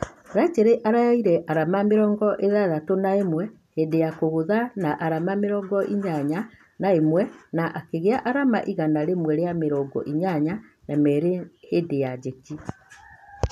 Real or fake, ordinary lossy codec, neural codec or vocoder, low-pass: real; none; none; 14.4 kHz